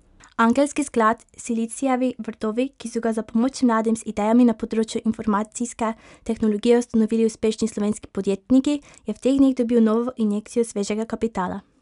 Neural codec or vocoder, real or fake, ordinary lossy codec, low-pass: none; real; none; 10.8 kHz